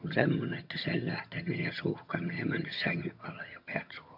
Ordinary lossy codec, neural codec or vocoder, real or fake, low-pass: MP3, 48 kbps; vocoder, 22.05 kHz, 80 mel bands, HiFi-GAN; fake; 5.4 kHz